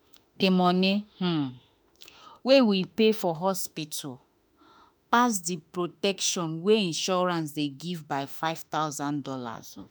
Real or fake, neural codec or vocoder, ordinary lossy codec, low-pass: fake; autoencoder, 48 kHz, 32 numbers a frame, DAC-VAE, trained on Japanese speech; none; none